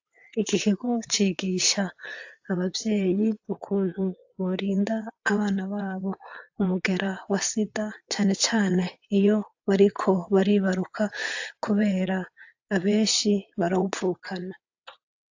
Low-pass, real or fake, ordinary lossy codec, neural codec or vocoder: 7.2 kHz; fake; AAC, 48 kbps; vocoder, 22.05 kHz, 80 mel bands, WaveNeXt